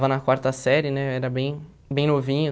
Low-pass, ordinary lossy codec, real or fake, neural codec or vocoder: none; none; real; none